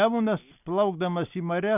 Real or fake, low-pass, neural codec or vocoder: real; 3.6 kHz; none